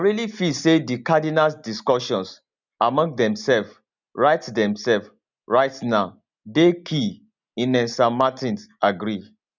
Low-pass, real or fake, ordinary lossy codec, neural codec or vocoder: 7.2 kHz; real; none; none